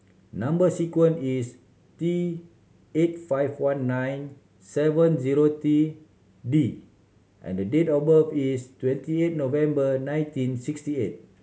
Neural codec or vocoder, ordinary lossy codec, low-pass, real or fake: none; none; none; real